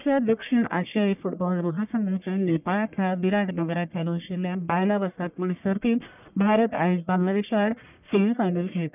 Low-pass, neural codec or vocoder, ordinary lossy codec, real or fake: 3.6 kHz; codec, 44.1 kHz, 1.7 kbps, Pupu-Codec; none; fake